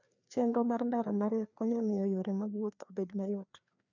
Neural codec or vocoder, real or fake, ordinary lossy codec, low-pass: codec, 16 kHz, 2 kbps, FreqCodec, larger model; fake; none; 7.2 kHz